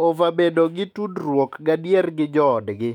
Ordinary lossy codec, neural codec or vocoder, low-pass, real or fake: none; autoencoder, 48 kHz, 128 numbers a frame, DAC-VAE, trained on Japanese speech; 19.8 kHz; fake